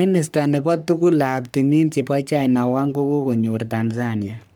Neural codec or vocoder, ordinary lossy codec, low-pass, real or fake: codec, 44.1 kHz, 3.4 kbps, Pupu-Codec; none; none; fake